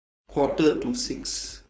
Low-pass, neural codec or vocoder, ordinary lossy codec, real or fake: none; codec, 16 kHz, 4.8 kbps, FACodec; none; fake